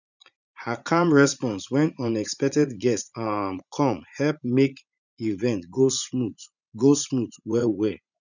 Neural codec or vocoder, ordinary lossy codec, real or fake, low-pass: vocoder, 44.1 kHz, 80 mel bands, Vocos; none; fake; 7.2 kHz